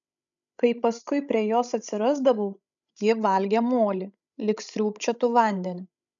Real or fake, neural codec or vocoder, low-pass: fake; codec, 16 kHz, 16 kbps, FreqCodec, larger model; 7.2 kHz